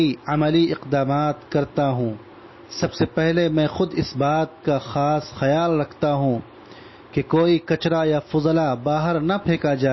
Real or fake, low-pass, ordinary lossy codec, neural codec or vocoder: real; 7.2 kHz; MP3, 24 kbps; none